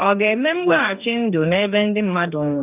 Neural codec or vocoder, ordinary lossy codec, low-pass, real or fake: codec, 44.1 kHz, 2.6 kbps, DAC; none; 3.6 kHz; fake